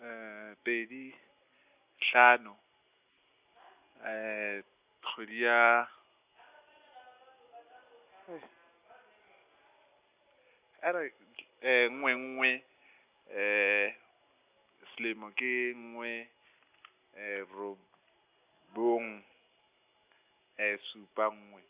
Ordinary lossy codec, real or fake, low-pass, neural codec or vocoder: Opus, 64 kbps; real; 3.6 kHz; none